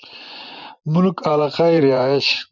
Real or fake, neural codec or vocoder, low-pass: fake; vocoder, 24 kHz, 100 mel bands, Vocos; 7.2 kHz